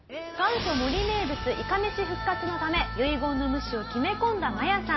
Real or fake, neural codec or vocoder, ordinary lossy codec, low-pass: real; none; MP3, 24 kbps; 7.2 kHz